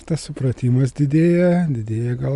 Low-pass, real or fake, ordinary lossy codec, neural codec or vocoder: 10.8 kHz; real; Opus, 64 kbps; none